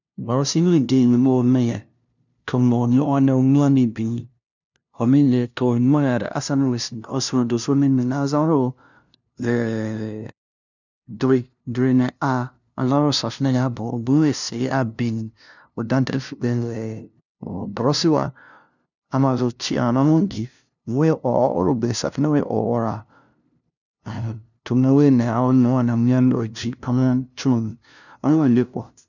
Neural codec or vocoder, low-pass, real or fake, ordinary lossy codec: codec, 16 kHz, 0.5 kbps, FunCodec, trained on LibriTTS, 25 frames a second; 7.2 kHz; fake; none